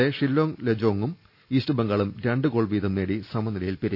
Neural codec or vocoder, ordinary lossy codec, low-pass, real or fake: none; none; 5.4 kHz; real